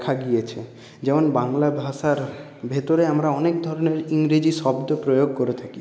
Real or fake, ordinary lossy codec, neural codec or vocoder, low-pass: real; none; none; none